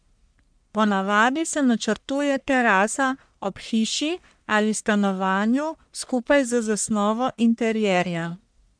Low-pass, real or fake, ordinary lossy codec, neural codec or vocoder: 9.9 kHz; fake; MP3, 96 kbps; codec, 44.1 kHz, 1.7 kbps, Pupu-Codec